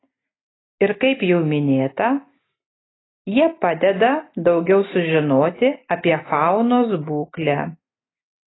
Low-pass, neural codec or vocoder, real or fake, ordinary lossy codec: 7.2 kHz; none; real; AAC, 16 kbps